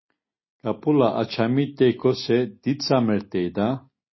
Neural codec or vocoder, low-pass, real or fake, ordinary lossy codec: none; 7.2 kHz; real; MP3, 24 kbps